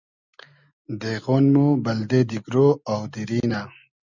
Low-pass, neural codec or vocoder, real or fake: 7.2 kHz; none; real